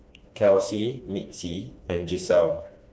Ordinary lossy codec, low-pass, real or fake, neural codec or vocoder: none; none; fake; codec, 16 kHz, 2 kbps, FreqCodec, smaller model